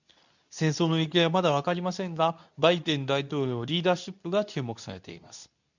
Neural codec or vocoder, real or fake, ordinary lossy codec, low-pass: codec, 24 kHz, 0.9 kbps, WavTokenizer, medium speech release version 2; fake; none; 7.2 kHz